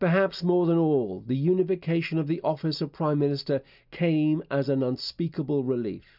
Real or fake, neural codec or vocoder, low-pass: real; none; 5.4 kHz